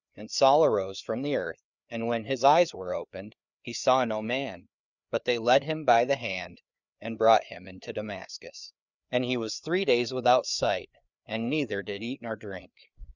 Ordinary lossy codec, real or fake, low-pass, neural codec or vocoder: Opus, 64 kbps; fake; 7.2 kHz; codec, 16 kHz, 4 kbps, FreqCodec, larger model